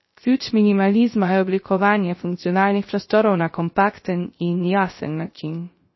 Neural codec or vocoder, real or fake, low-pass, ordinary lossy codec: codec, 16 kHz, 0.3 kbps, FocalCodec; fake; 7.2 kHz; MP3, 24 kbps